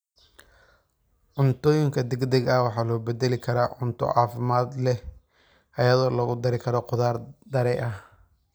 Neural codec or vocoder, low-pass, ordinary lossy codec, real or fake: none; none; none; real